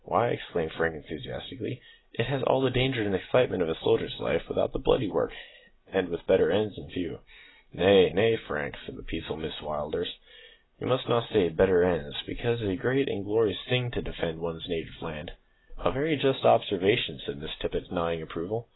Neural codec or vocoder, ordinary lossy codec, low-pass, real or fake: none; AAC, 16 kbps; 7.2 kHz; real